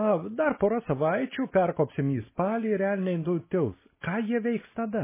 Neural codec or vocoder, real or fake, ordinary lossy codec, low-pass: none; real; MP3, 16 kbps; 3.6 kHz